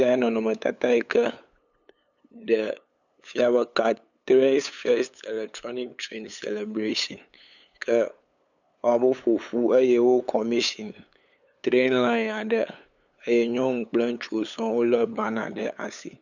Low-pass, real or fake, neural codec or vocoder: 7.2 kHz; fake; codec, 16 kHz, 8 kbps, FunCodec, trained on LibriTTS, 25 frames a second